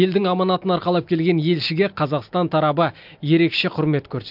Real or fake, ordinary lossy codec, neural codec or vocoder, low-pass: real; MP3, 48 kbps; none; 5.4 kHz